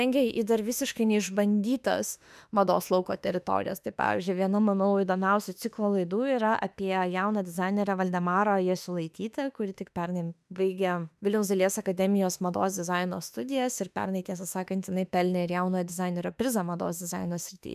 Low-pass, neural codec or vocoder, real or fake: 14.4 kHz; autoencoder, 48 kHz, 32 numbers a frame, DAC-VAE, trained on Japanese speech; fake